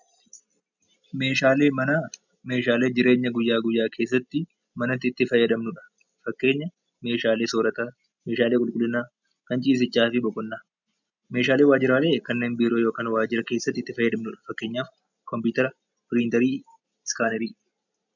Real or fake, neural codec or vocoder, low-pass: real; none; 7.2 kHz